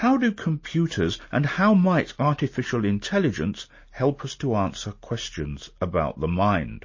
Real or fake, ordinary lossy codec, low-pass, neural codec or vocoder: real; MP3, 32 kbps; 7.2 kHz; none